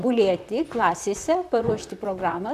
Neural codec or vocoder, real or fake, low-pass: vocoder, 44.1 kHz, 128 mel bands, Pupu-Vocoder; fake; 14.4 kHz